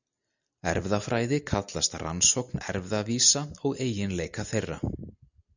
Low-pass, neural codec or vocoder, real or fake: 7.2 kHz; none; real